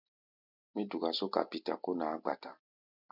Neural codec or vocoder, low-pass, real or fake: none; 5.4 kHz; real